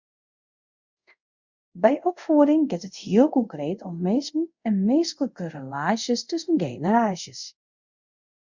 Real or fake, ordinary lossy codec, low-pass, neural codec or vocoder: fake; Opus, 64 kbps; 7.2 kHz; codec, 24 kHz, 0.5 kbps, DualCodec